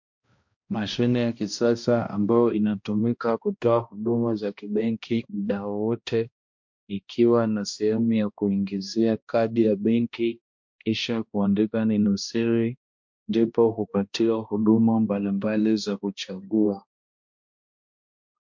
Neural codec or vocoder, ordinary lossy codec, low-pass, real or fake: codec, 16 kHz, 1 kbps, X-Codec, HuBERT features, trained on balanced general audio; MP3, 48 kbps; 7.2 kHz; fake